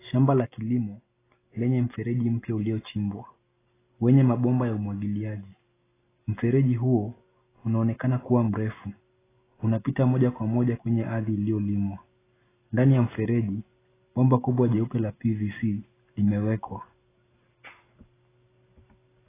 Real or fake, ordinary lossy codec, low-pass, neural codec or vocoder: real; AAC, 16 kbps; 3.6 kHz; none